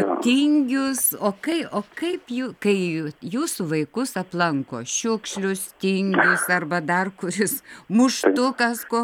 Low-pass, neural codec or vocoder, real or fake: 19.8 kHz; none; real